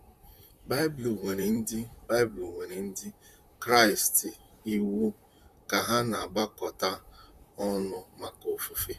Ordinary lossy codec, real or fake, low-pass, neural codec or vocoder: none; fake; 14.4 kHz; vocoder, 44.1 kHz, 128 mel bands, Pupu-Vocoder